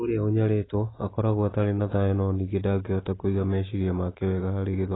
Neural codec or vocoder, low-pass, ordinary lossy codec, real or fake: none; 7.2 kHz; AAC, 16 kbps; real